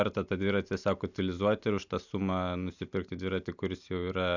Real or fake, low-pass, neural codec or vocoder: real; 7.2 kHz; none